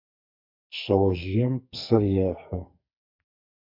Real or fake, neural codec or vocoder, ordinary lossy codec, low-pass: fake; codec, 44.1 kHz, 2.6 kbps, SNAC; MP3, 48 kbps; 5.4 kHz